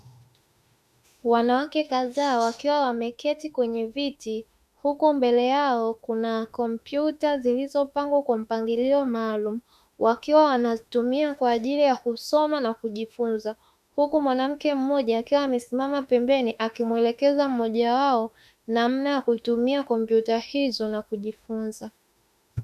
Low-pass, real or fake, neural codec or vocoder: 14.4 kHz; fake; autoencoder, 48 kHz, 32 numbers a frame, DAC-VAE, trained on Japanese speech